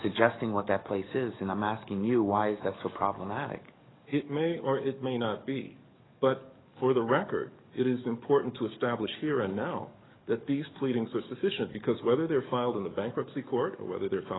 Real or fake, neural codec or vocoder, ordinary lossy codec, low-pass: fake; codec, 44.1 kHz, 7.8 kbps, DAC; AAC, 16 kbps; 7.2 kHz